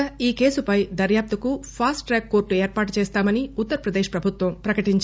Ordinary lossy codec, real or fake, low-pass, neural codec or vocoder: none; real; none; none